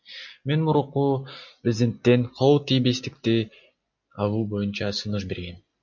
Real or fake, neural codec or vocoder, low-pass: real; none; 7.2 kHz